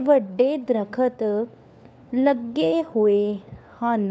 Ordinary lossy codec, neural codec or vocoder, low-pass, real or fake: none; codec, 16 kHz, 4 kbps, FunCodec, trained on LibriTTS, 50 frames a second; none; fake